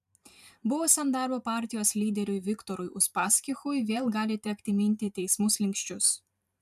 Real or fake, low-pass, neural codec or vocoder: fake; 14.4 kHz; vocoder, 44.1 kHz, 128 mel bands every 512 samples, BigVGAN v2